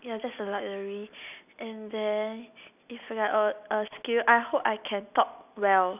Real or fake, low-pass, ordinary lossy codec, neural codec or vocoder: real; 3.6 kHz; none; none